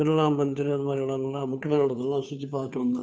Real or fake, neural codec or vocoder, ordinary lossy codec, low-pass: fake; codec, 16 kHz, 2 kbps, FunCodec, trained on Chinese and English, 25 frames a second; none; none